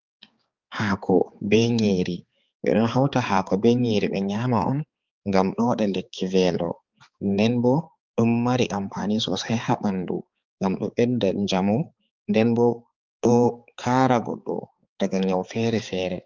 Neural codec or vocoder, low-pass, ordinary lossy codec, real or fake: codec, 16 kHz, 4 kbps, X-Codec, HuBERT features, trained on balanced general audio; 7.2 kHz; Opus, 32 kbps; fake